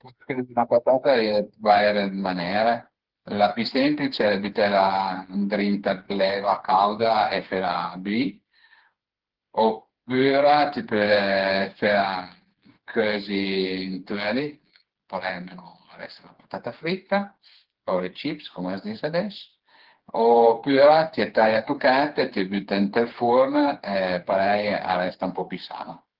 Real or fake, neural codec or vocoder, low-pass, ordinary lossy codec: fake; codec, 16 kHz, 4 kbps, FreqCodec, smaller model; 5.4 kHz; Opus, 16 kbps